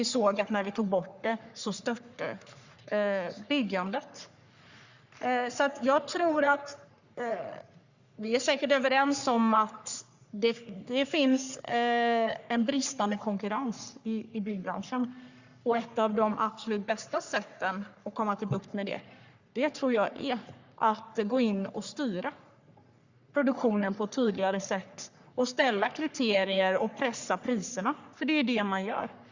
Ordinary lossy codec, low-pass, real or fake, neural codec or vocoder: Opus, 64 kbps; 7.2 kHz; fake; codec, 44.1 kHz, 3.4 kbps, Pupu-Codec